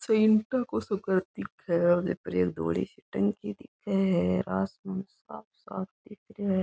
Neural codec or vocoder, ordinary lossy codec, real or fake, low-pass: none; none; real; none